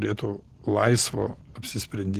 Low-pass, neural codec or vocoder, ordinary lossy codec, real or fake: 14.4 kHz; none; Opus, 16 kbps; real